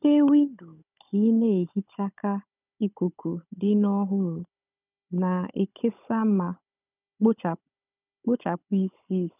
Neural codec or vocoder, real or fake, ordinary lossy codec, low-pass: codec, 16 kHz, 16 kbps, FunCodec, trained on Chinese and English, 50 frames a second; fake; none; 3.6 kHz